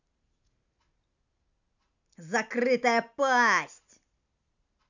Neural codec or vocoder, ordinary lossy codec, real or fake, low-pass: none; none; real; 7.2 kHz